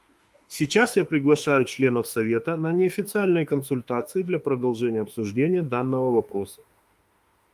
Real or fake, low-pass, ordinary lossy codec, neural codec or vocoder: fake; 14.4 kHz; Opus, 32 kbps; autoencoder, 48 kHz, 32 numbers a frame, DAC-VAE, trained on Japanese speech